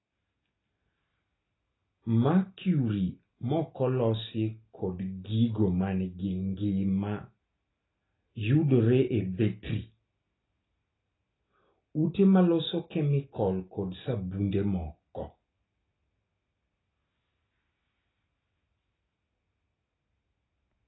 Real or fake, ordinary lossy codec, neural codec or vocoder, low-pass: real; AAC, 16 kbps; none; 7.2 kHz